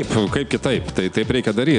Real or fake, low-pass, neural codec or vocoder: real; 9.9 kHz; none